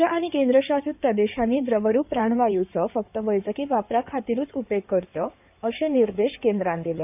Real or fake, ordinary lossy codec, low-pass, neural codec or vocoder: fake; none; 3.6 kHz; codec, 16 kHz in and 24 kHz out, 2.2 kbps, FireRedTTS-2 codec